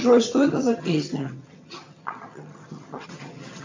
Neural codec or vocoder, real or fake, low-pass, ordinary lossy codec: vocoder, 22.05 kHz, 80 mel bands, HiFi-GAN; fake; 7.2 kHz; MP3, 48 kbps